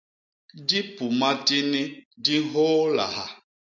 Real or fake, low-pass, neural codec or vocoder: real; 7.2 kHz; none